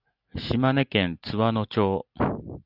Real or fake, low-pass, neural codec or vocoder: real; 5.4 kHz; none